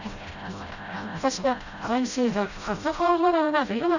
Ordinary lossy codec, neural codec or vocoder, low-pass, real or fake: none; codec, 16 kHz, 0.5 kbps, FreqCodec, smaller model; 7.2 kHz; fake